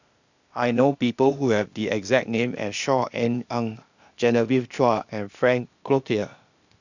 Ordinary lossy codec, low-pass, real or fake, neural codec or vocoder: none; 7.2 kHz; fake; codec, 16 kHz, 0.8 kbps, ZipCodec